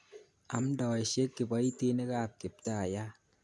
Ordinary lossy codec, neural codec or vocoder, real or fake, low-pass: none; none; real; none